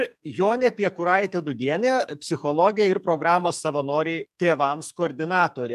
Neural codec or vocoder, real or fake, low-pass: codec, 44.1 kHz, 2.6 kbps, SNAC; fake; 14.4 kHz